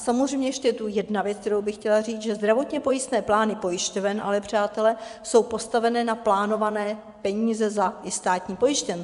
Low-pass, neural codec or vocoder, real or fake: 10.8 kHz; vocoder, 24 kHz, 100 mel bands, Vocos; fake